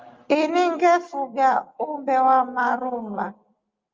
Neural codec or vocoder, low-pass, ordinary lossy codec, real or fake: none; 7.2 kHz; Opus, 32 kbps; real